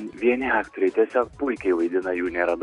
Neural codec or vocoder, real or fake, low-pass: none; real; 10.8 kHz